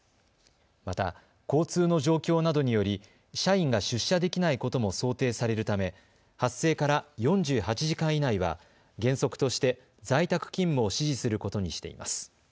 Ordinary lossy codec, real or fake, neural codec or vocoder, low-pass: none; real; none; none